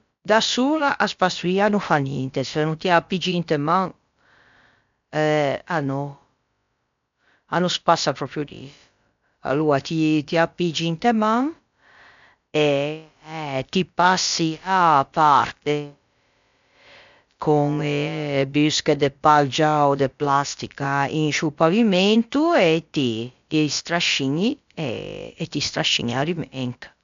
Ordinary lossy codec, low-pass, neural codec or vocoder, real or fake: MP3, 64 kbps; 7.2 kHz; codec, 16 kHz, about 1 kbps, DyCAST, with the encoder's durations; fake